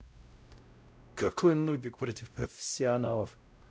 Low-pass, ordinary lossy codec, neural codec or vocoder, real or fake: none; none; codec, 16 kHz, 0.5 kbps, X-Codec, WavLM features, trained on Multilingual LibriSpeech; fake